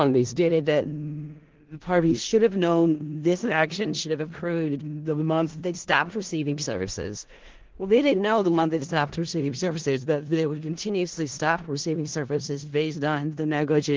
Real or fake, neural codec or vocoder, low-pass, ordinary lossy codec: fake; codec, 16 kHz in and 24 kHz out, 0.4 kbps, LongCat-Audio-Codec, four codebook decoder; 7.2 kHz; Opus, 16 kbps